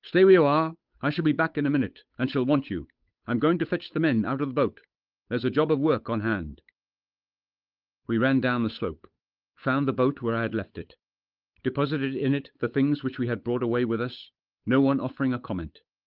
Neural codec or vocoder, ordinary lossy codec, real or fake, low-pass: codec, 16 kHz, 8 kbps, FunCodec, trained on Chinese and English, 25 frames a second; Opus, 24 kbps; fake; 5.4 kHz